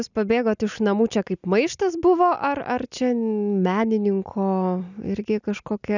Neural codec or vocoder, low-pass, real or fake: none; 7.2 kHz; real